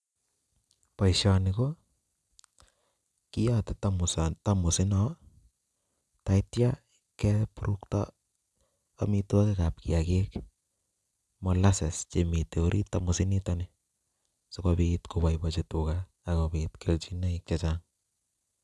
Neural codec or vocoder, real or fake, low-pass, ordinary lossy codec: none; real; none; none